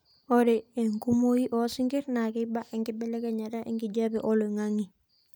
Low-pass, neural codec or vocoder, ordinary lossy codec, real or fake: none; none; none; real